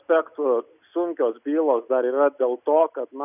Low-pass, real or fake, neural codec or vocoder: 3.6 kHz; real; none